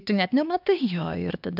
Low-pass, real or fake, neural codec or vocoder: 5.4 kHz; fake; codec, 16 kHz, 2 kbps, X-Codec, HuBERT features, trained on LibriSpeech